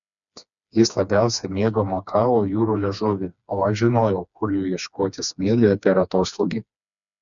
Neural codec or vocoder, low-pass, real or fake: codec, 16 kHz, 2 kbps, FreqCodec, smaller model; 7.2 kHz; fake